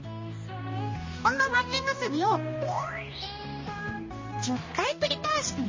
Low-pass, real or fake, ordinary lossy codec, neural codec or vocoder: 7.2 kHz; fake; MP3, 32 kbps; codec, 16 kHz, 1 kbps, X-Codec, HuBERT features, trained on balanced general audio